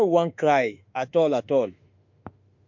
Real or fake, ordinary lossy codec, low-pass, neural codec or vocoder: fake; MP3, 48 kbps; 7.2 kHz; autoencoder, 48 kHz, 32 numbers a frame, DAC-VAE, trained on Japanese speech